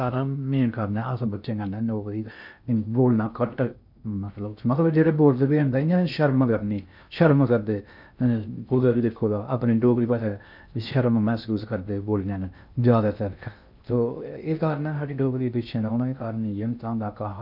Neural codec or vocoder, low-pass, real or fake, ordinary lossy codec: codec, 16 kHz in and 24 kHz out, 0.6 kbps, FocalCodec, streaming, 2048 codes; 5.4 kHz; fake; none